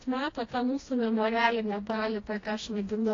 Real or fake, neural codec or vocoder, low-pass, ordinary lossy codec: fake; codec, 16 kHz, 1 kbps, FreqCodec, smaller model; 7.2 kHz; AAC, 32 kbps